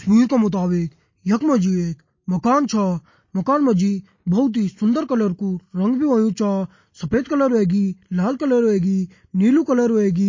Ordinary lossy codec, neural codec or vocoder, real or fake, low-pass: MP3, 32 kbps; none; real; 7.2 kHz